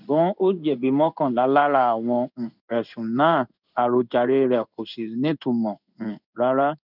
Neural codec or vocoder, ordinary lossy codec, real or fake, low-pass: codec, 16 kHz in and 24 kHz out, 1 kbps, XY-Tokenizer; none; fake; 5.4 kHz